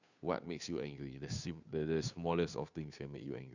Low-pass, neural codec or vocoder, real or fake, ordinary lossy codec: 7.2 kHz; codec, 16 kHz, 2 kbps, FunCodec, trained on Chinese and English, 25 frames a second; fake; none